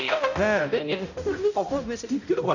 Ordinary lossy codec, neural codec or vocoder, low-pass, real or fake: none; codec, 16 kHz, 0.5 kbps, X-Codec, HuBERT features, trained on balanced general audio; 7.2 kHz; fake